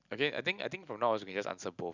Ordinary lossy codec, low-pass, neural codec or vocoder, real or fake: none; 7.2 kHz; none; real